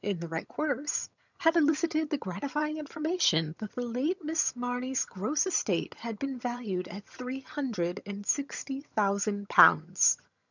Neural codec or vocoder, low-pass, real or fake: vocoder, 22.05 kHz, 80 mel bands, HiFi-GAN; 7.2 kHz; fake